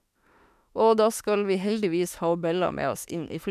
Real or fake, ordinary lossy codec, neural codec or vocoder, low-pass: fake; none; autoencoder, 48 kHz, 32 numbers a frame, DAC-VAE, trained on Japanese speech; 14.4 kHz